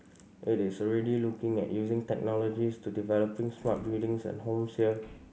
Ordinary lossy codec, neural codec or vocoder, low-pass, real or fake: none; none; none; real